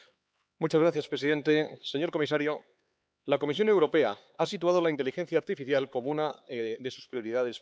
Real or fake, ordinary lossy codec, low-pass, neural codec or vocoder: fake; none; none; codec, 16 kHz, 4 kbps, X-Codec, HuBERT features, trained on LibriSpeech